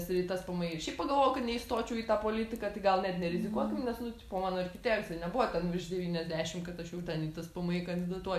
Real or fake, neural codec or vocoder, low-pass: real; none; 14.4 kHz